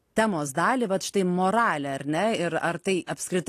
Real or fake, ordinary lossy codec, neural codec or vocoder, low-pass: real; AAC, 48 kbps; none; 14.4 kHz